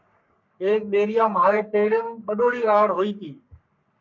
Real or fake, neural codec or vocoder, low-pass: fake; codec, 44.1 kHz, 3.4 kbps, Pupu-Codec; 7.2 kHz